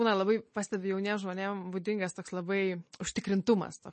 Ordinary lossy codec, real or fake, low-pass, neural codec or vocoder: MP3, 32 kbps; real; 10.8 kHz; none